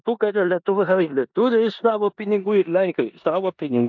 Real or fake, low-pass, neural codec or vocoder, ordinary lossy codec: fake; 7.2 kHz; codec, 16 kHz in and 24 kHz out, 0.9 kbps, LongCat-Audio-Codec, four codebook decoder; MP3, 64 kbps